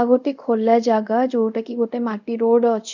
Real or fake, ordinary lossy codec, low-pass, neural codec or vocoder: fake; none; 7.2 kHz; codec, 24 kHz, 0.5 kbps, DualCodec